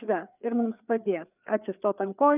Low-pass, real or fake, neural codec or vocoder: 3.6 kHz; fake; codec, 16 kHz, 4 kbps, FreqCodec, larger model